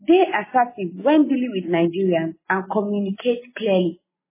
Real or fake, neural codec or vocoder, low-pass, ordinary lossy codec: real; none; 3.6 kHz; MP3, 16 kbps